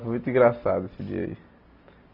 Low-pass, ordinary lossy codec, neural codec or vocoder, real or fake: 5.4 kHz; none; none; real